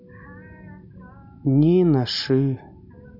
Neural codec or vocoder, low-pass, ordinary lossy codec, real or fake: none; 5.4 kHz; none; real